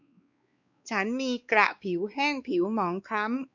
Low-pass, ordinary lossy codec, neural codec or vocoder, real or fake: 7.2 kHz; none; codec, 16 kHz, 2 kbps, X-Codec, WavLM features, trained on Multilingual LibriSpeech; fake